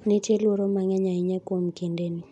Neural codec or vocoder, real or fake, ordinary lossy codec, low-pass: none; real; none; 10.8 kHz